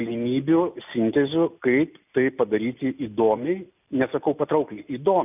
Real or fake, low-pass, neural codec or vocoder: real; 3.6 kHz; none